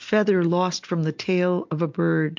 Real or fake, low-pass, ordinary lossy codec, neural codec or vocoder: real; 7.2 kHz; MP3, 48 kbps; none